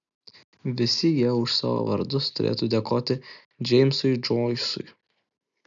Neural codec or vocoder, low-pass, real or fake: none; 7.2 kHz; real